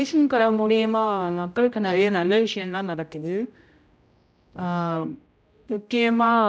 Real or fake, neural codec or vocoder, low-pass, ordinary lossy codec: fake; codec, 16 kHz, 0.5 kbps, X-Codec, HuBERT features, trained on general audio; none; none